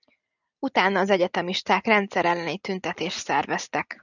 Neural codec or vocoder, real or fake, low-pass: none; real; 7.2 kHz